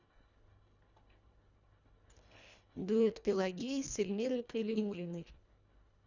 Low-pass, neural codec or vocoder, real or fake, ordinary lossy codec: 7.2 kHz; codec, 24 kHz, 1.5 kbps, HILCodec; fake; none